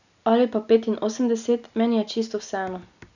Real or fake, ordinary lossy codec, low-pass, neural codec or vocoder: real; none; 7.2 kHz; none